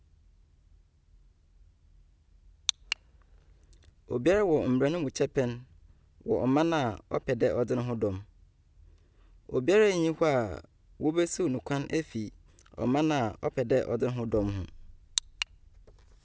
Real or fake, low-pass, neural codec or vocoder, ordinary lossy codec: real; none; none; none